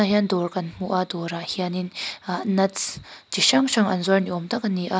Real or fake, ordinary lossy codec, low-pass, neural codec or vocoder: real; none; none; none